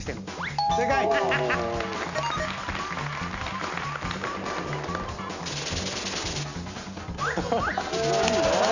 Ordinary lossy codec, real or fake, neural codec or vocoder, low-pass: none; real; none; 7.2 kHz